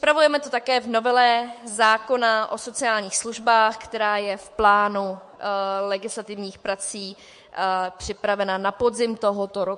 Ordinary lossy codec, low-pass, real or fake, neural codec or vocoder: MP3, 48 kbps; 10.8 kHz; fake; codec, 24 kHz, 3.1 kbps, DualCodec